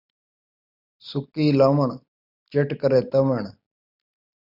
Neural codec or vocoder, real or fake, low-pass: none; real; 5.4 kHz